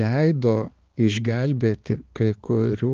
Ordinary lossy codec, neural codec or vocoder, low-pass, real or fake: Opus, 16 kbps; codec, 16 kHz, 2 kbps, X-Codec, HuBERT features, trained on balanced general audio; 7.2 kHz; fake